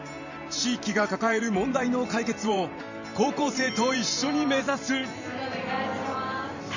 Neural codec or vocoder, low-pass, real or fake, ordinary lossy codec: vocoder, 44.1 kHz, 128 mel bands every 512 samples, BigVGAN v2; 7.2 kHz; fake; none